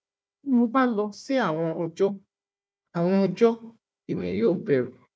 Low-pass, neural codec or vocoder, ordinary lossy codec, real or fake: none; codec, 16 kHz, 1 kbps, FunCodec, trained on Chinese and English, 50 frames a second; none; fake